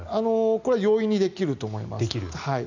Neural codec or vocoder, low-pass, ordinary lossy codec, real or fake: none; 7.2 kHz; none; real